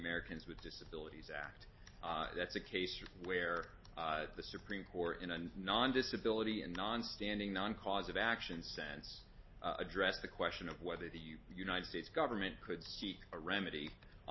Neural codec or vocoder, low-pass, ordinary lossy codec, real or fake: none; 7.2 kHz; MP3, 24 kbps; real